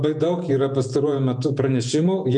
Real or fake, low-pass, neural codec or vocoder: fake; 10.8 kHz; vocoder, 48 kHz, 128 mel bands, Vocos